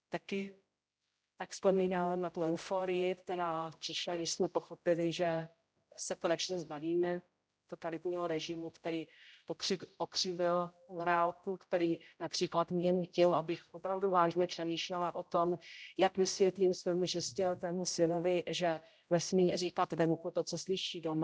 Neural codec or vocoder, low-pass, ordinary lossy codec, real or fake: codec, 16 kHz, 0.5 kbps, X-Codec, HuBERT features, trained on general audio; none; none; fake